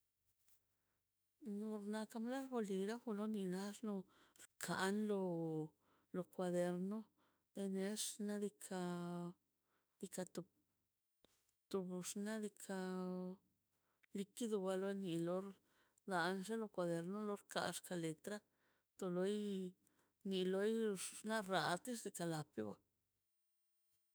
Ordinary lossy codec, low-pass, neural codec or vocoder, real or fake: none; none; autoencoder, 48 kHz, 32 numbers a frame, DAC-VAE, trained on Japanese speech; fake